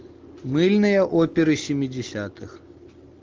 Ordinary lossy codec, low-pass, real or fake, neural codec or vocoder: Opus, 24 kbps; 7.2 kHz; real; none